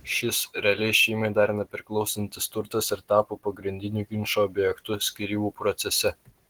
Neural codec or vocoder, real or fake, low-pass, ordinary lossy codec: none; real; 19.8 kHz; Opus, 16 kbps